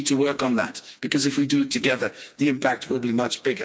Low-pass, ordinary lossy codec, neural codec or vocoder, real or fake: none; none; codec, 16 kHz, 2 kbps, FreqCodec, smaller model; fake